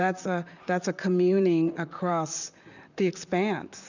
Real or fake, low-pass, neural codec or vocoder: fake; 7.2 kHz; vocoder, 44.1 kHz, 128 mel bands, Pupu-Vocoder